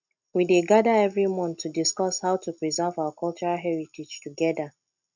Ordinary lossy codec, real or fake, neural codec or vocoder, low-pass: none; real; none; 7.2 kHz